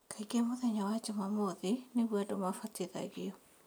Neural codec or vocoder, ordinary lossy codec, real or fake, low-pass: none; none; real; none